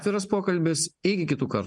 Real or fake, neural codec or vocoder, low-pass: real; none; 10.8 kHz